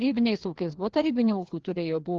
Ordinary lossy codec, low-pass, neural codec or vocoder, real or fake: Opus, 16 kbps; 7.2 kHz; codec, 16 kHz, 2 kbps, FreqCodec, larger model; fake